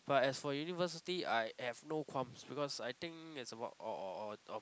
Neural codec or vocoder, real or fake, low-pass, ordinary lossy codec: none; real; none; none